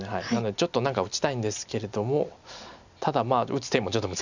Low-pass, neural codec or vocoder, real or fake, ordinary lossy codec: 7.2 kHz; none; real; none